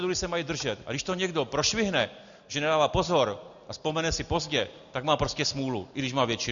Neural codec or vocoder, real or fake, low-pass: none; real; 7.2 kHz